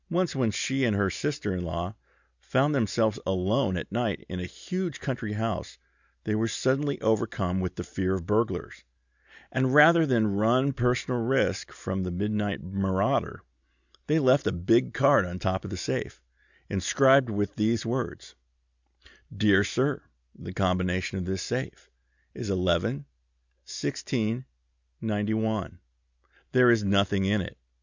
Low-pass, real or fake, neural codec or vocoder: 7.2 kHz; real; none